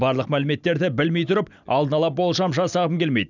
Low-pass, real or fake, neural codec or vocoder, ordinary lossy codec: 7.2 kHz; real; none; none